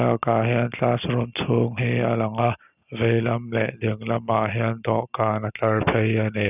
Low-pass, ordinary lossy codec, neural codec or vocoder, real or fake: 3.6 kHz; none; none; real